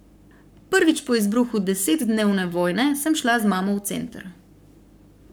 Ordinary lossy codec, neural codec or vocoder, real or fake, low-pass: none; codec, 44.1 kHz, 7.8 kbps, Pupu-Codec; fake; none